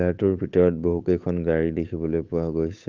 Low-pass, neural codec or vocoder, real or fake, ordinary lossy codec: 7.2 kHz; codec, 16 kHz, 8 kbps, FunCodec, trained on Chinese and English, 25 frames a second; fake; Opus, 32 kbps